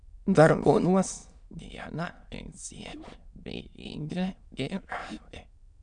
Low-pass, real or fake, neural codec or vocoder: 9.9 kHz; fake; autoencoder, 22.05 kHz, a latent of 192 numbers a frame, VITS, trained on many speakers